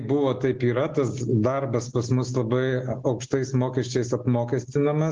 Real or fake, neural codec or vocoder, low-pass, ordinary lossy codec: real; none; 7.2 kHz; Opus, 16 kbps